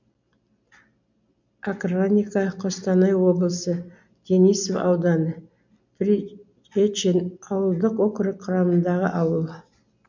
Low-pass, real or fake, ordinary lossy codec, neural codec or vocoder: 7.2 kHz; real; MP3, 64 kbps; none